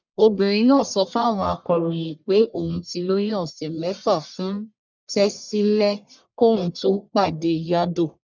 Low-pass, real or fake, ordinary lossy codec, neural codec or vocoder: 7.2 kHz; fake; none; codec, 44.1 kHz, 1.7 kbps, Pupu-Codec